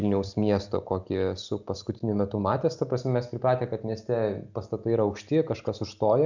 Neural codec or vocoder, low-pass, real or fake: none; 7.2 kHz; real